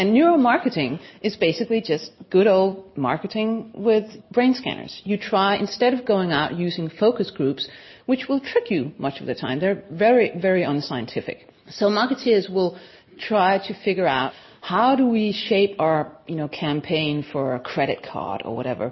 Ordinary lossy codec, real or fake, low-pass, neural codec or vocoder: MP3, 24 kbps; real; 7.2 kHz; none